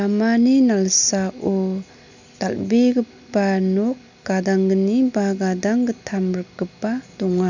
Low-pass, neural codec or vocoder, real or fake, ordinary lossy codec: 7.2 kHz; none; real; none